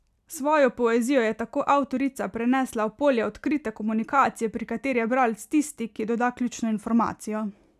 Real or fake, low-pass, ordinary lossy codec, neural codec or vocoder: real; 14.4 kHz; none; none